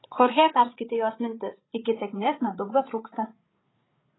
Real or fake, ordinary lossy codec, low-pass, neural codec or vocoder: fake; AAC, 16 kbps; 7.2 kHz; codec, 16 kHz, 8 kbps, FreqCodec, larger model